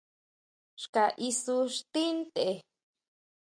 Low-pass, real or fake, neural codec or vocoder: 9.9 kHz; real; none